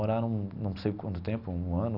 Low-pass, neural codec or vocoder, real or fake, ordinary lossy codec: 5.4 kHz; none; real; none